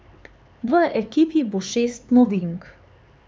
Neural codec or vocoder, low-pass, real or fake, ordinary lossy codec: codec, 16 kHz, 4 kbps, X-Codec, HuBERT features, trained on LibriSpeech; none; fake; none